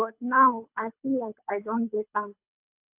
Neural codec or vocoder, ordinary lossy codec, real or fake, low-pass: codec, 24 kHz, 3 kbps, HILCodec; AAC, 32 kbps; fake; 3.6 kHz